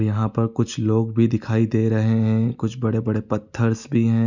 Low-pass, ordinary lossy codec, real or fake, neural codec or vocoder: 7.2 kHz; none; real; none